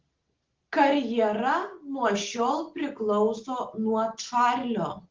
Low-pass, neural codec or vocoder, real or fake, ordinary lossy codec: 7.2 kHz; none; real; Opus, 16 kbps